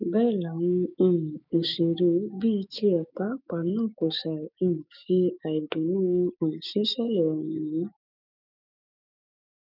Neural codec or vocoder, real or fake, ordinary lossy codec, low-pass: codec, 16 kHz, 6 kbps, DAC; fake; none; 5.4 kHz